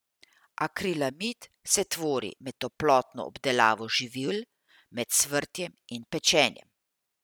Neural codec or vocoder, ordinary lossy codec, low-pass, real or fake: none; none; none; real